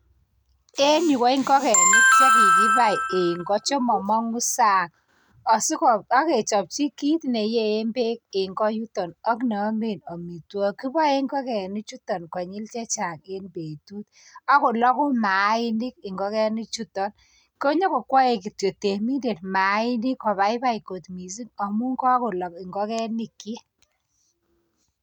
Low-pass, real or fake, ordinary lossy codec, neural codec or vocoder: none; real; none; none